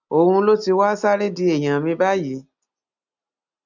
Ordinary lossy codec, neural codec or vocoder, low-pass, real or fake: none; none; 7.2 kHz; real